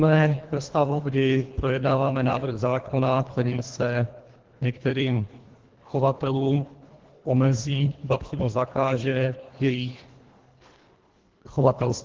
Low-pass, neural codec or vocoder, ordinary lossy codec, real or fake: 7.2 kHz; codec, 24 kHz, 1.5 kbps, HILCodec; Opus, 16 kbps; fake